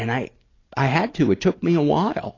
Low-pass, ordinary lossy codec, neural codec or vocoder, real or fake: 7.2 kHz; AAC, 32 kbps; none; real